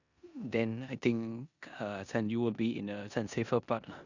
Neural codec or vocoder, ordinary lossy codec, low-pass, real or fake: codec, 16 kHz in and 24 kHz out, 0.9 kbps, LongCat-Audio-Codec, four codebook decoder; none; 7.2 kHz; fake